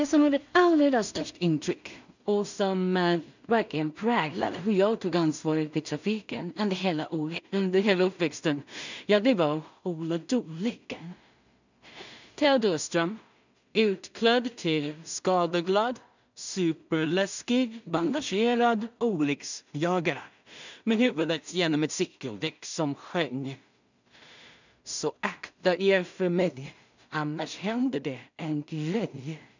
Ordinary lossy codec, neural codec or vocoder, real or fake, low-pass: none; codec, 16 kHz in and 24 kHz out, 0.4 kbps, LongCat-Audio-Codec, two codebook decoder; fake; 7.2 kHz